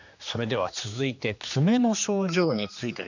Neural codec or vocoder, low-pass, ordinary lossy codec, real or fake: codec, 16 kHz, 2 kbps, X-Codec, HuBERT features, trained on general audio; 7.2 kHz; none; fake